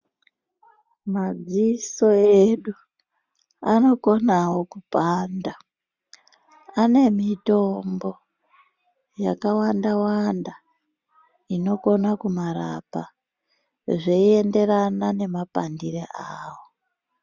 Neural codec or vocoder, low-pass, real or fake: none; 7.2 kHz; real